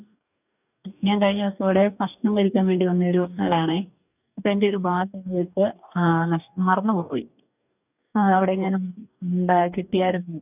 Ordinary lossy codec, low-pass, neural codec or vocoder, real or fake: none; 3.6 kHz; codec, 44.1 kHz, 2.6 kbps, DAC; fake